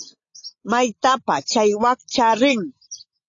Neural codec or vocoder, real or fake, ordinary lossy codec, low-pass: none; real; AAC, 48 kbps; 7.2 kHz